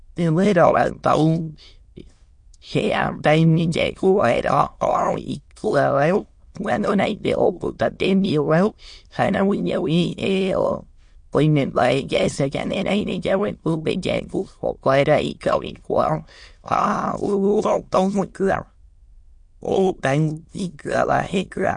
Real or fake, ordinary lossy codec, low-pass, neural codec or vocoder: fake; MP3, 48 kbps; 9.9 kHz; autoencoder, 22.05 kHz, a latent of 192 numbers a frame, VITS, trained on many speakers